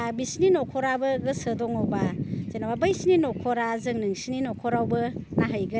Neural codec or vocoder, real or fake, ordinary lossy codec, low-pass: none; real; none; none